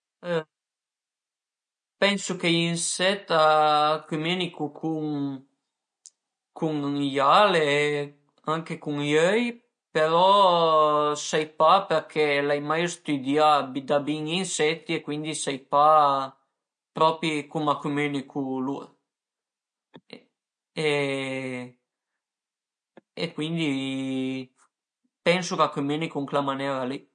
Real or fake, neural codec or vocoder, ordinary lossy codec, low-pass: real; none; MP3, 48 kbps; 9.9 kHz